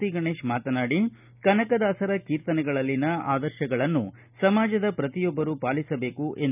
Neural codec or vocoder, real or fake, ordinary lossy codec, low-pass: none; real; none; 3.6 kHz